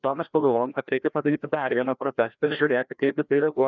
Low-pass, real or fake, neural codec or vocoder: 7.2 kHz; fake; codec, 16 kHz, 1 kbps, FreqCodec, larger model